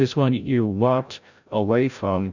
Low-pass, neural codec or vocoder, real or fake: 7.2 kHz; codec, 16 kHz, 0.5 kbps, FreqCodec, larger model; fake